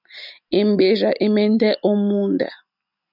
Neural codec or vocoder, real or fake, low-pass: none; real; 5.4 kHz